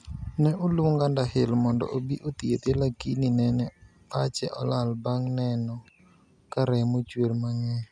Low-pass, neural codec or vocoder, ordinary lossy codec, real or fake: 9.9 kHz; none; none; real